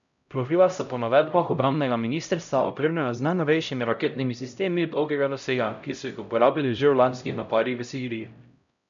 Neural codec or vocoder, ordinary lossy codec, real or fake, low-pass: codec, 16 kHz, 0.5 kbps, X-Codec, HuBERT features, trained on LibriSpeech; none; fake; 7.2 kHz